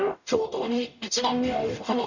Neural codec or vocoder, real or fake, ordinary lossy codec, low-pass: codec, 44.1 kHz, 0.9 kbps, DAC; fake; none; 7.2 kHz